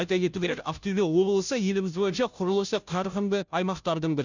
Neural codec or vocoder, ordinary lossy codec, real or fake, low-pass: codec, 16 kHz, 0.5 kbps, FunCodec, trained on Chinese and English, 25 frames a second; none; fake; 7.2 kHz